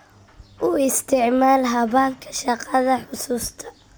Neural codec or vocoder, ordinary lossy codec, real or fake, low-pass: none; none; real; none